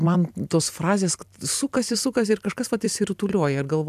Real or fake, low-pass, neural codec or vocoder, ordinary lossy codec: fake; 14.4 kHz; vocoder, 44.1 kHz, 128 mel bands every 256 samples, BigVGAN v2; AAC, 96 kbps